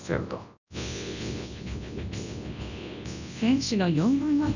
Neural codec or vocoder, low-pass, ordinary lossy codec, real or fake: codec, 24 kHz, 0.9 kbps, WavTokenizer, large speech release; 7.2 kHz; none; fake